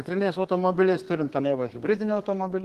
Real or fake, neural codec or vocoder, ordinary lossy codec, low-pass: fake; codec, 32 kHz, 1.9 kbps, SNAC; Opus, 16 kbps; 14.4 kHz